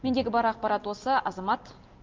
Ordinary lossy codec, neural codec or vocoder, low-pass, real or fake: Opus, 32 kbps; none; 7.2 kHz; real